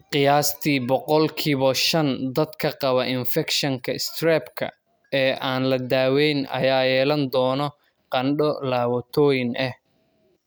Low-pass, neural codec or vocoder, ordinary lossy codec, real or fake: none; none; none; real